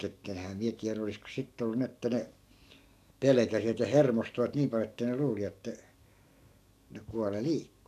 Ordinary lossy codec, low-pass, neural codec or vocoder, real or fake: none; 14.4 kHz; codec, 44.1 kHz, 7.8 kbps, DAC; fake